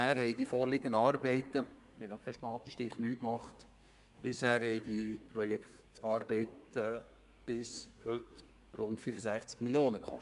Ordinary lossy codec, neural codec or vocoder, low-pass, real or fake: none; codec, 24 kHz, 1 kbps, SNAC; 10.8 kHz; fake